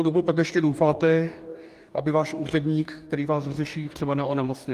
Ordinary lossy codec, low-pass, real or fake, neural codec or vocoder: Opus, 32 kbps; 14.4 kHz; fake; codec, 44.1 kHz, 2.6 kbps, DAC